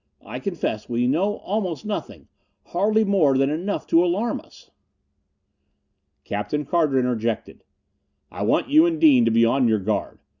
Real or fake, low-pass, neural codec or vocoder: real; 7.2 kHz; none